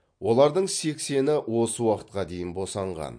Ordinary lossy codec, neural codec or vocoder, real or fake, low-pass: MP3, 48 kbps; none; real; 9.9 kHz